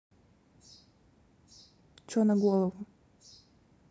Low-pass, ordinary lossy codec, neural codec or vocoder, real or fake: none; none; none; real